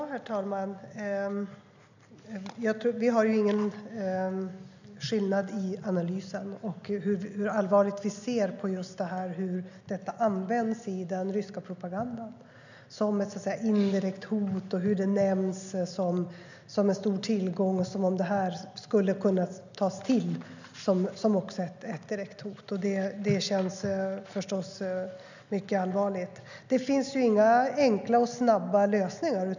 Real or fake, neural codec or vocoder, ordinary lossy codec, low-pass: real; none; none; 7.2 kHz